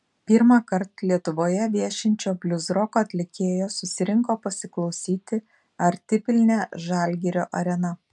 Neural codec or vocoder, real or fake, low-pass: none; real; 10.8 kHz